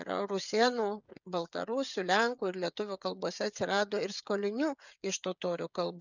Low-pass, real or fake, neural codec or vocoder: 7.2 kHz; fake; codec, 16 kHz, 16 kbps, FreqCodec, smaller model